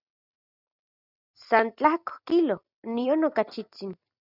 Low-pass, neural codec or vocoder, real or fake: 5.4 kHz; none; real